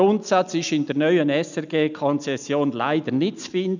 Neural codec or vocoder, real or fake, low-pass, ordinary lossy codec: none; real; 7.2 kHz; none